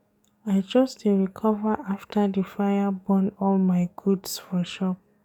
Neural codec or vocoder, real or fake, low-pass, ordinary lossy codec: codec, 44.1 kHz, 7.8 kbps, DAC; fake; 19.8 kHz; none